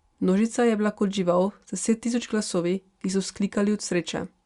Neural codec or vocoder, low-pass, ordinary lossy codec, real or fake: none; 10.8 kHz; Opus, 64 kbps; real